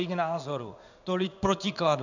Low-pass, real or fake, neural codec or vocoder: 7.2 kHz; fake; codec, 16 kHz in and 24 kHz out, 1 kbps, XY-Tokenizer